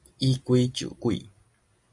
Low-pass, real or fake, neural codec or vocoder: 10.8 kHz; real; none